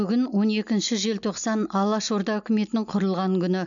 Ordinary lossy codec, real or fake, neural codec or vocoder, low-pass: none; real; none; 7.2 kHz